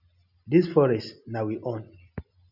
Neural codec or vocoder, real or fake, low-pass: none; real; 5.4 kHz